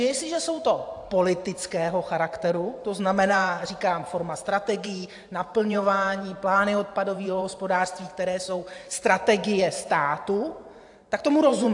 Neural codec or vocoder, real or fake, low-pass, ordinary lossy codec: vocoder, 44.1 kHz, 128 mel bands every 512 samples, BigVGAN v2; fake; 10.8 kHz; AAC, 64 kbps